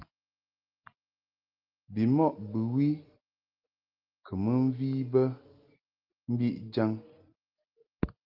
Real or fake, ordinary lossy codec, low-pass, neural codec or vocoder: real; Opus, 32 kbps; 5.4 kHz; none